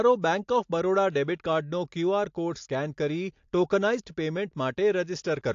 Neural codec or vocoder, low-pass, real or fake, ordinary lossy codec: none; 7.2 kHz; real; AAC, 48 kbps